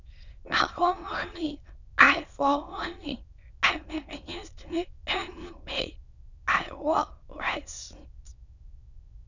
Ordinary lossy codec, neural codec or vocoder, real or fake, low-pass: none; autoencoder, 22.05 kHz, a latent of 192 numbers a frame, VITS, trained on many speakers; fake; 7.2 kHz